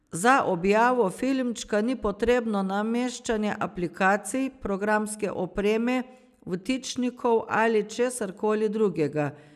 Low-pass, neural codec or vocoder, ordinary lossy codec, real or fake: 14.4 kHz; none; none; real